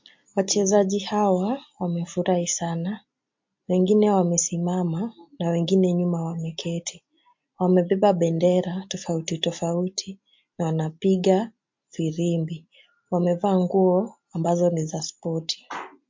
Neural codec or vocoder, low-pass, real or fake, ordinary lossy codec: none; 7.2 kHz; real; MP3, 48 kbps